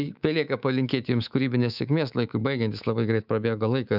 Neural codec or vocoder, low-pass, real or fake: codec, 24 kHz, 3.1 kbps, DualCodec; 5.4 kHz; fake